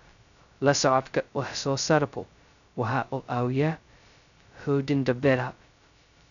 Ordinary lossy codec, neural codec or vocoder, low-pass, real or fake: none; codec, 16 kHz, 0.2 kbps, FocalCodec; 7.2 kHz; fake